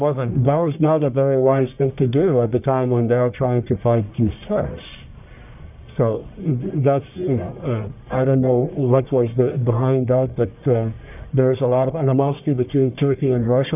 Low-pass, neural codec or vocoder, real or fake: 3.6 kHz; codec, 44.1 kHz, 1.7 kbps, Pupu-Codec; fake